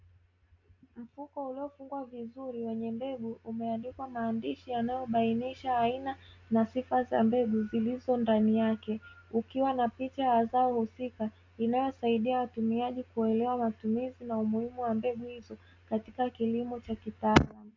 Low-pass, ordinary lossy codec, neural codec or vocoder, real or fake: 7.2 kHz; MP3, 48 kbps; none; real